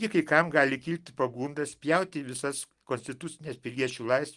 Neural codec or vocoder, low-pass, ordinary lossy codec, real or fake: none; 10.8 kHz; Opus, 24 kbps; real